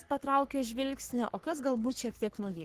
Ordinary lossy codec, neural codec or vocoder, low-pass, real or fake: Opus, 16 kbps; codec, 44.1 kHz, 3.4 kbps, Pupu-Codec; 14.4 kHz; fake